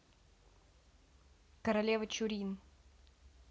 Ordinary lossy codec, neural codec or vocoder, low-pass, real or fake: none; none; none; real